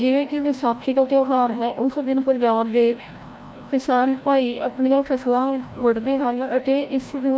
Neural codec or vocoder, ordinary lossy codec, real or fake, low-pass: codec, 16 kHz, 0.5 kbps, FreqCodec, larger model; none; fake; none